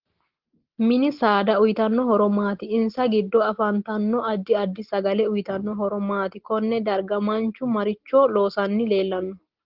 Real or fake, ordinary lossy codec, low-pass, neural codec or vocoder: real; Opus, 16 kbps; 5.4 kHz; none